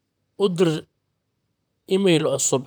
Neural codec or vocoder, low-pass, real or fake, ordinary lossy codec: vocoder, 44.1 kHz, 128 mel bands, Pupu-Vocoder; none; fake; none